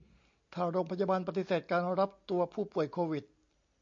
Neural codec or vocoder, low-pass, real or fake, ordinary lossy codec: none; 7.2 kHz; real; MP3, 48 kbps